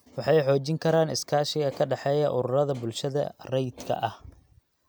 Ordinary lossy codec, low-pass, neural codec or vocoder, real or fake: none; none; none; real